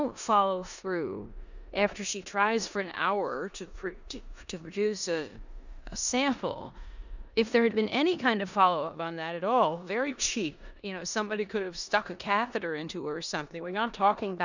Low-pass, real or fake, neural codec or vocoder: 7.2 kHz; fake; codec, 16 kHz in and 24 kHz out, 0.9 kbps, LongCat-Audio-Codec, four codebook decoder